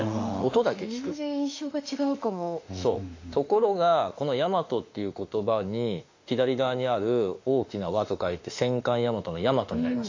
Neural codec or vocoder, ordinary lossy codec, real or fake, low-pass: autoencoder, 48 kHz, 32 numbers a frame, DAC-VAE, trained on Japanese speech; AAC, 48 kbps; fake; 7.2 kHz